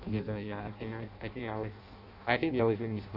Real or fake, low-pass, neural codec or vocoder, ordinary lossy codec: fake; 5.4 kHz; codec, 16 kHz in and 24 kHz out, 0.6 kbps, FireRedTTS-2 codec; none